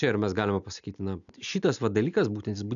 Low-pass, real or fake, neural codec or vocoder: 7.2 kHz; real; none